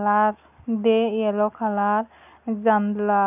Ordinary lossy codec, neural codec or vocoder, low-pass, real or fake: none; none; 3.6 kHz; real